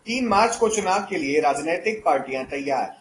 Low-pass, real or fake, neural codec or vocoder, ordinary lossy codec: 10.8 kHz; real; none; AAC, 32 kbps